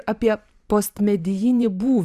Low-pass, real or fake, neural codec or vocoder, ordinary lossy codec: 14.4 kHz; fake; vocoder, 44.1 kHz, 128 mel bands every 512 samples, BigVGAN v2; Opus, 64 kbps